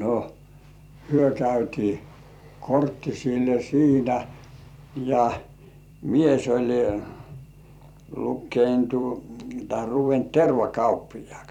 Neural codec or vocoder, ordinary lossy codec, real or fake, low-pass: vocoder, 44.1 kHz, 128 mel bands every 256 samples, BigVGAN v2; none; fake; 19.8 kHz